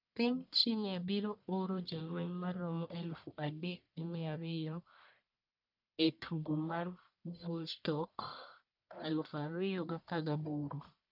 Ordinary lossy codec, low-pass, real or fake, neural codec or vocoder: none; 5.4 kHz; fake; codec, 44.1 kHz, 1.7 kbps, Pupu-Codec